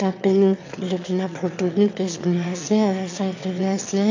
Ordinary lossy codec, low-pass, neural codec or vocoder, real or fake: AAC, 48 kbps; 7.2 kHz; autoencoder, 22.05 kHz, a latent of 192 numbers a frame, VITS, trained on one speaker; fake